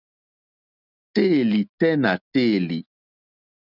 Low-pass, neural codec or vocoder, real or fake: 5.4 kHz; none; real